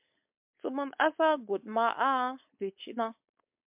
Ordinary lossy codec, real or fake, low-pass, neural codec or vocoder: MP3, 32 kbps; fake; 3.6 kHz; codec, 16 kHz, 4.8 kbps, FACodec